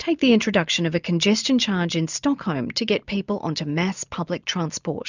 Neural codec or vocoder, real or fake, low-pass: none; real; 7.2 kHz